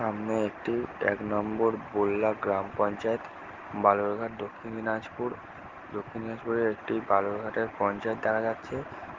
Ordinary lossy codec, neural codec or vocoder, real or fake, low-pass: Opus, 16 kbps; none; real; 7.2 kHz